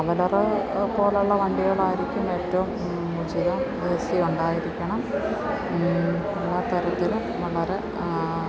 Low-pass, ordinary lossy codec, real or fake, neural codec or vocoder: none; none; real; none